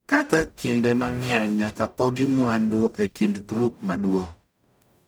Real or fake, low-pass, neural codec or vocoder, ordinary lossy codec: fake; none; codec, 44.1 kHz, 0.9 kbps, DAC; none